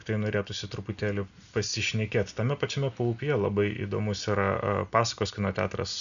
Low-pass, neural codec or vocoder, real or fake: 7.2 kHz; none; real